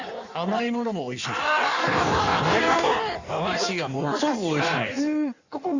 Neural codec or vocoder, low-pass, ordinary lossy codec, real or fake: codec, 16 kHz in and 24 kHz out, 1.1 kbps, FireRedTTS-2 codec; 7.2 kHz; Opus, 64 kbps; fake